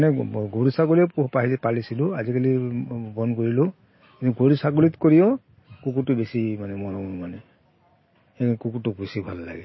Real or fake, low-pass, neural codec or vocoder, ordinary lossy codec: real; 7.2 kHz; none; MP3, 24 kbps